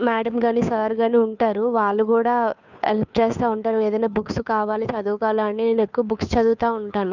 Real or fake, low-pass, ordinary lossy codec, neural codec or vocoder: fake; 7.2 kHz; none; codec, 16 kHz, 2 kbps, FunCodec, trained on Chinese and English, 25 frames a second